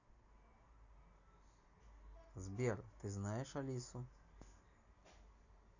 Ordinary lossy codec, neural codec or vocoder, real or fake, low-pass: none; none; real; 7.2 kHz